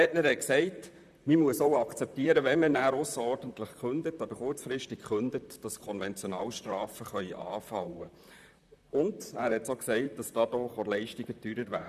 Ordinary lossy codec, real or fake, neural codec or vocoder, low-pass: none; fake; vocoder, 44.1 kHz, 128 mel bands, Pupu-Vocoder; 14.4 kHz